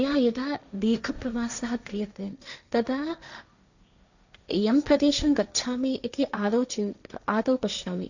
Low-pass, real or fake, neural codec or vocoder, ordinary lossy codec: 7.2 kHz; fake; codec, 16 kHz, 1.1 kbps, Voila-Tokenizer; none